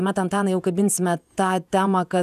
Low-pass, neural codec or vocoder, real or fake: 14.4 kHz; vocoder, 48 kHz, 128 mel bands, Vocos; fake